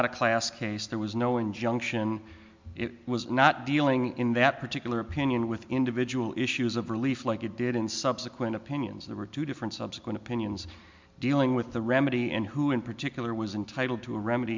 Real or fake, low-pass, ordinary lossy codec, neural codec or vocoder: real; 7.2 kHz; MP3, 64 kbps; none